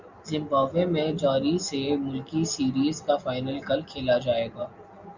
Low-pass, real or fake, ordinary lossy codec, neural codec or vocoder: 7.2 kHz; real; Opus, 64 kbps; none